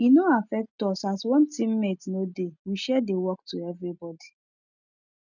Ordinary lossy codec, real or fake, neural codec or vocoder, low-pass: none; real; none; 7.2 kHz